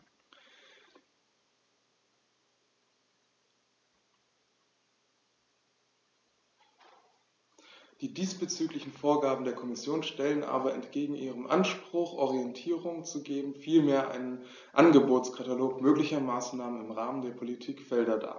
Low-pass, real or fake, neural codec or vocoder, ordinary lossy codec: 7.2 kHz; real; none; none